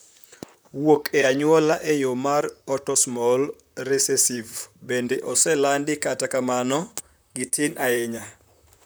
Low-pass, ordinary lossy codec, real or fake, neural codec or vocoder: none; none; fake; vocoder, 44.1 kHz, 128 mel bands, Pupu-Vocoder